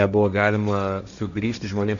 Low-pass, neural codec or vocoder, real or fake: 7.2 kHz; codec, 16 kHz, 1.1 kbps, Voila-Tokenizer; fake